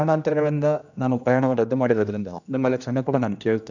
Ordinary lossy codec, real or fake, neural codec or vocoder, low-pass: none; fake; codec, 16 kHz, 1 kbps, X-Codec, HuBERT features, trained on general audio; 7.2 kHz